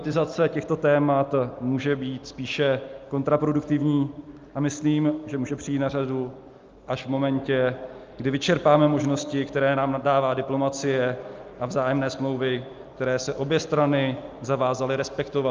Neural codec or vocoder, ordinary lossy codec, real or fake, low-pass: none; Opus, 24 kbps; real; 7.2 kHz